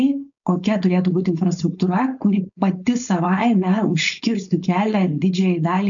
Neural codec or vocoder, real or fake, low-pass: codec, 16 kHz, 4.8 kbps, FACodec; fake; 7.2 kHz